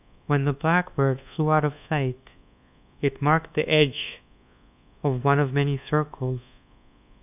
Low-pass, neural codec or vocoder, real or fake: 3.6 kHz; codec, 24 kHz, 1.2 kbps, DualCodec; fake